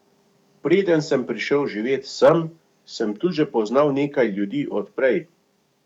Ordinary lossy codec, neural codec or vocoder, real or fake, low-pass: none; codec, 44.1 kHz, 7.8 kbps, DAC; fake; 19.8 kHz